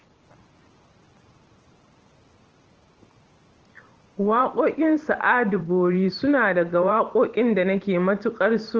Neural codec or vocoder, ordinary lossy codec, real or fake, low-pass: vocoder, 44.1 kHz, 128 mel bands every 512 samples, BigVGAN v2; Opus, 16 kbps; fake; 7.2 kHz